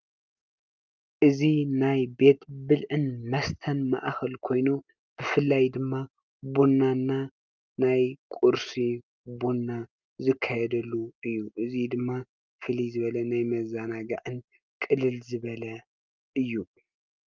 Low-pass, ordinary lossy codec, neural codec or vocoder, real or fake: 7.2 kHz; Opus, 24 kbps; none; real